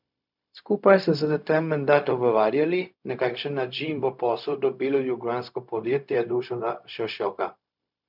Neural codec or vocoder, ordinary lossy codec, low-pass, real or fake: codec, 16 kHz, 0.4 kbps, LongCat-Audio-Codec; none; 5.4 kHz; fake